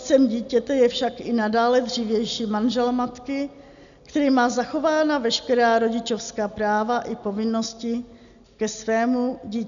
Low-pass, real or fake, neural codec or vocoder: 7.2 kHz; real; none